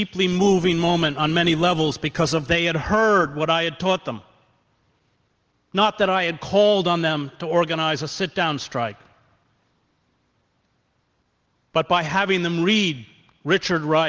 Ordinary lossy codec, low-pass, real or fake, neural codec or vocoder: Opus, 16 kbps; 7.2 kHz; real; none